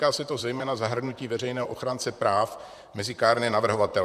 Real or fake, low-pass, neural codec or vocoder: fake; 14.4 kHz; vocoder, 44.1 kHz, 128 mel bands, Pupu-Vocoder